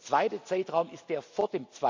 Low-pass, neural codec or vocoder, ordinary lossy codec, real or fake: 7.2 kHz; none; MP3, 64 kbps; real